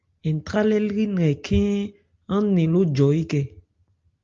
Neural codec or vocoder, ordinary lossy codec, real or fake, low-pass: none; Opus, 32 kbps; real; 7.2 kHz